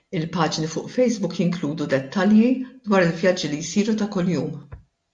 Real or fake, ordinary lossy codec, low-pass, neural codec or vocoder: real; AAC, 64 kbps; 9.9 kHz; none